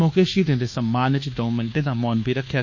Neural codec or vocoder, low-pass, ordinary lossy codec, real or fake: codec, 24 kHz, 1.2 kbps, DualCodec; 7.2 kHz; none; fake